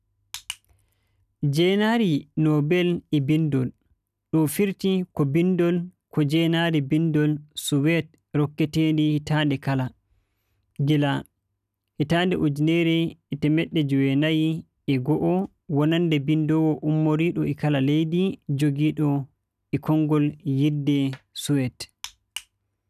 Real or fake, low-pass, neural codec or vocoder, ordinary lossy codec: real; 14.4 kHz; none; none